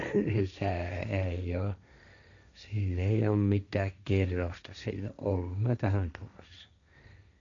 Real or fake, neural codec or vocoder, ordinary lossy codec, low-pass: fake; codec, 16 kHz, 1.1 kbps, Voila-Tokenizer; none; 7.2 kHz